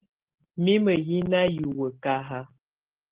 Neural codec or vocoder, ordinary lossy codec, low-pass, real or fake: none; Opus, 16 kbps; 3.6 kHz; real